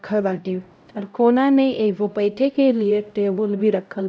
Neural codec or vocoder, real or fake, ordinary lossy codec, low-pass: codec, 16 kHz, 0.5 kbps, X-Codec, HuBERT features, trained on LibriSpeech; fake; none; none